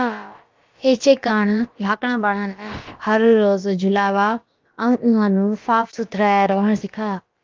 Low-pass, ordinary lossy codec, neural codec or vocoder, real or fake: 7.2 kHz; Opus, 32 kbps; codec, 16 kHz, about 1 kbps, DyCAST, with the encoder's durations; fake